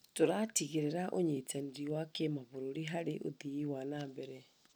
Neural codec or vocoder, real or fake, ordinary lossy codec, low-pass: none; real; none; none